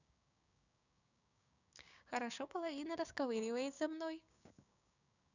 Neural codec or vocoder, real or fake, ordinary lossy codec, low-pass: codec, 16 kHz, 6 kbps, DAC; fake; none; 7.2 kHz